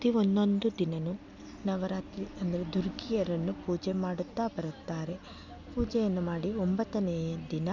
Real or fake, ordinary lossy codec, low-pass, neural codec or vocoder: real; none; 7.2 kHz; none